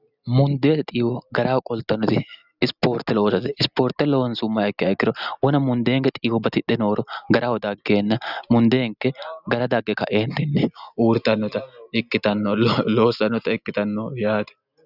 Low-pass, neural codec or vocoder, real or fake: 5.4 kHz; none; real